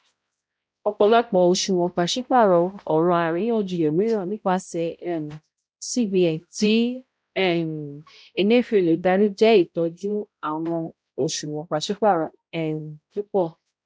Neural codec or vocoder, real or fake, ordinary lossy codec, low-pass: codec, 16 kHz, 0.5 kbps, X-Codec, HuBERT features, trained on balanced general audio; fake; none; none